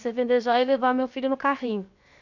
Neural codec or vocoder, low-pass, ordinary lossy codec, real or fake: codec, 16 kHz, 0.7 kbps, FocalCodec; 7.2 kHz; none; fake